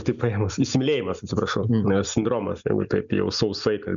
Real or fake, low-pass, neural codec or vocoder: real; 7.2 kHz; none